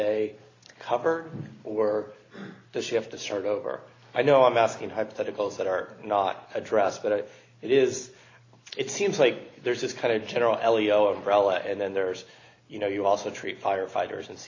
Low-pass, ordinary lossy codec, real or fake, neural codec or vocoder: 7.2 kHz; AAC, 32 kbps; real; none